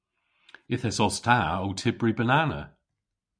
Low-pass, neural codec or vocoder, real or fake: 9.9 kHz; none; real